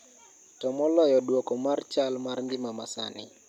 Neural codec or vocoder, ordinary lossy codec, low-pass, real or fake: none; none; 19.8 kHz; real